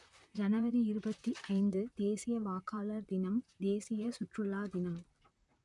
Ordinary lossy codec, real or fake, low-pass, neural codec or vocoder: none; fake; 10.8 kHz; vocoder, 44.1 kHz, 128 mel bands, Pupu-Vocoder